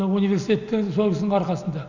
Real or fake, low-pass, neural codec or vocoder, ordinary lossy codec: real; 7.2 kHz; none; none